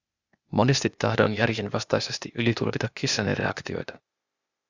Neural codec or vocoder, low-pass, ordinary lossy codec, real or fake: codec, 16 kHz, 0.8 kbps, ZipCodec; 7.2 kHz; Opus, 64 kbps; fake